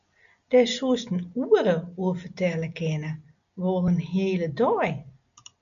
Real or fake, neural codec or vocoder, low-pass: real; none; 7.2 kHz